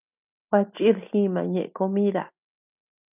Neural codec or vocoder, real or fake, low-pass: none; real; 3.6 kHz